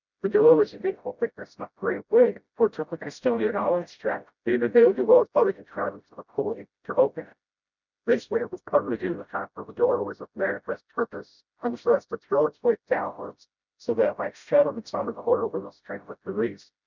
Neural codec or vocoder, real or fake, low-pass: codec, 16 kHz, 0.5 kbps, FreqCodec, smaller model; fake; 7.2 kHz